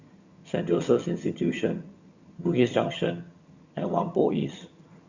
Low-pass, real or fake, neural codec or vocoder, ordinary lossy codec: 7.2 kHz; fake; vocoder, 22.05 kHz, 80 mel bands, HiFi-GAN; Opus, 64 kbps